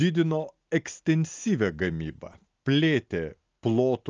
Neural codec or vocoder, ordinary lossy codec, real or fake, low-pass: none; Opus, 24 kbps; real; 7.2 kHz